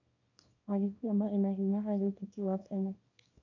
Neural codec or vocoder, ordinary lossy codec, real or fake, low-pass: codec, 24 kHz, 0.9 kbps, WavTokenizer, small release; none; fake; 7.2 kHz